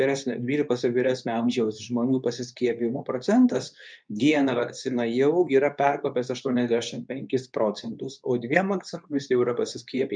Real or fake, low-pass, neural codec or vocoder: fake; 9.9 kHz; codec, 24 kHz, 0.9 kbps, WavTokenizer, medium speech release version 2